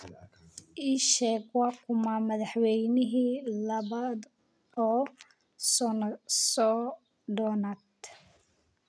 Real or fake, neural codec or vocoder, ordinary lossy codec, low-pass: real; none; none; none